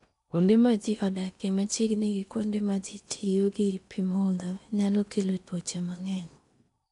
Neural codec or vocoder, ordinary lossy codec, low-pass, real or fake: codec, 16 kHz in and 24 kHz out, 0.8 kbps, FocalCodec, streaming, 65536 codes; none; 10.8 kHz; fake